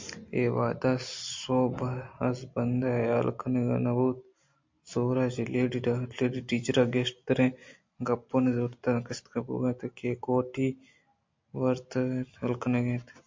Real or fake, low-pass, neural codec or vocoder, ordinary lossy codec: real; 7.2 kHz; none; MP3, 48 kbps